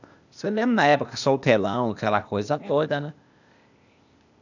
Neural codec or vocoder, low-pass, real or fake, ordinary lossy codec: codec, 16 kHz, 0.8 kbps, ZipCodec; 7.2 kHz; fake; none